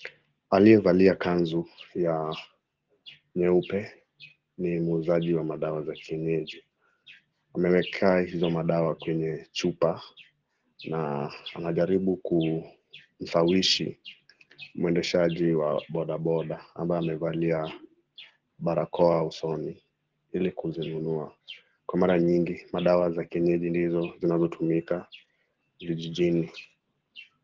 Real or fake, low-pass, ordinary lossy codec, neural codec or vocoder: real; 7.2 kHz; Opus, 16 kbps; none